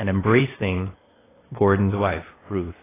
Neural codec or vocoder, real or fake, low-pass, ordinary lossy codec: codec, 16 kHz, 0.8 kbps, ZipCodec; fake; 3.6 kHz; AAC, 16 kbps